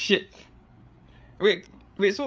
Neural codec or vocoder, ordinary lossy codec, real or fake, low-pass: codec, 16 kHz, 8 kbps, FreqCodec, larger model; none; fake; none